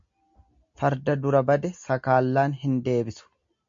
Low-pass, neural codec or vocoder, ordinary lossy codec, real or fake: 7.2 kHz; none; MP3, 48 kbps; real